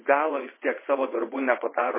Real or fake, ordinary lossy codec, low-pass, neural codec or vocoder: fake; MP3, 16 kbps; 3.6 kHz; vocoder, 22.05 kHz, 80 mel bands, WaveNeXt